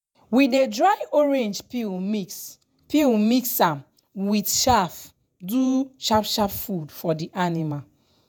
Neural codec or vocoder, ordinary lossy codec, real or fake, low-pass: vocoder, 48 kHz, 128 mel bands, Vocos; none; fake; none